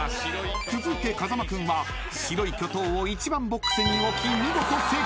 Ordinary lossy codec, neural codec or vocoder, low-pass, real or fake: none; none; none; real